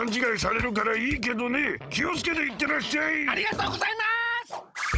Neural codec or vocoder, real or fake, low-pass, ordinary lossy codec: codec, 16 kHz, 16 kbps, FunCodec, trained on Chinese and English, 50 frames a second; fake; none; none